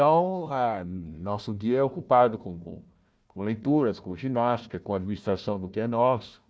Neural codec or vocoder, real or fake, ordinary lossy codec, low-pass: codec, 16 kHz, 1 kbps, FunCodec, trained on Chinese and English, 50 frames a second; fake; none; none